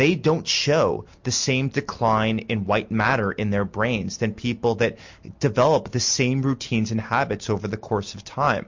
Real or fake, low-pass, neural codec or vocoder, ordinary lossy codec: real; 7.2 kHz; none; MP3, 48 kbps